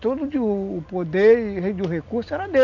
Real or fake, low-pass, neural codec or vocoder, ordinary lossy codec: real; 7.2 kHz; none; none